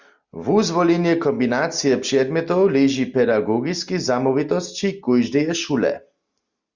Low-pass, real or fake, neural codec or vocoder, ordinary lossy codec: 7.2 kHz; real; none; Opus, 64 kbps